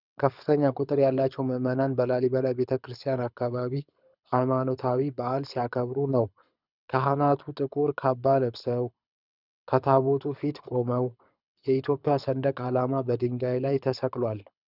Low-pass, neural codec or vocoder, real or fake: 5.4 kHz; codec, 24 kHz, 6 kbps, HILCodec; fake